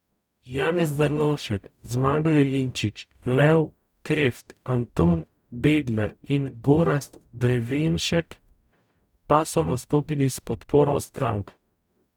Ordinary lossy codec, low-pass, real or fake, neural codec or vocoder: none; 19.8 kHz; fake; codec, 44.1 kHz, 0.9 kbps, DAC